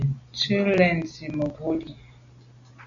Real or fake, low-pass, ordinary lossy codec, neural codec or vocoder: real; 7.2 kHz; MP3, 96 kbps; none